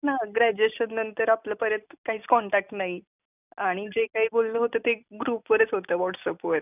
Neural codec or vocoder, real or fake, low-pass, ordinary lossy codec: none; real; 3.6 kHz; none